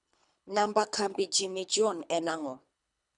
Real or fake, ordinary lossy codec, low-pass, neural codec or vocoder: fake; none; 10.8 kHz; codec, 24 kHz, 3 kbps, HILCodec